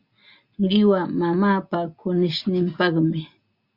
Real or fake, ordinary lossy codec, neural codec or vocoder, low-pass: real; Opus, 64 kbps; none; 5.4 kHz